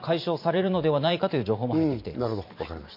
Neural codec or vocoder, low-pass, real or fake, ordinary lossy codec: vocoder, 44.1 kHz, 128 mel bands every 512 samples, BigVGAN v2; 5.4 kHz; fake; MP3, 32 kbps